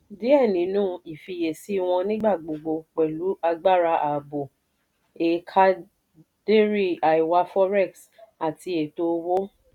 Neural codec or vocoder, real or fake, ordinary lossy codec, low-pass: none; real; none; 19.8 kHz